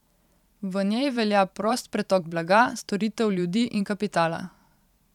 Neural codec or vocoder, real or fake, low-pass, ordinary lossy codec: vocoder, 44.1 kHz, 128 mel bands every 512 samples, BigVGAN v2; fake; 19.8 kHz; none